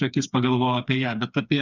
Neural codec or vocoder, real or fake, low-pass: codec, 16 kHz, 4 kbps, FreqCodec, smaller model; fake; 7.2 kHz